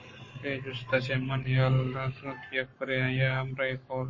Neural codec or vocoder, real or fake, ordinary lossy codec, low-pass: codec, 44.1 kHz, 7.8 kbps, Pupu-Codec; fake; MP3, 32 kbps; 7.2 kHz